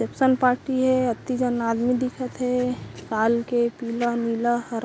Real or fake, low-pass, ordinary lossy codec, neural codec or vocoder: real; none; none; none